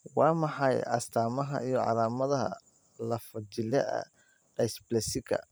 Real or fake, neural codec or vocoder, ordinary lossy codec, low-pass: fake; vocoder, 44.1 kHz, 128 mel bands every 512 samples, BigVGAN v2; none; none